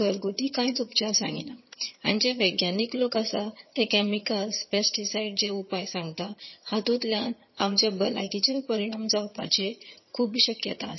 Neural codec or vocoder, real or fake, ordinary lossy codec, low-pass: vocoder, 22.05 kHz, 80 mel bands, HiFi-GAN; fake; MP3, 24 kbps; 7.2 kHz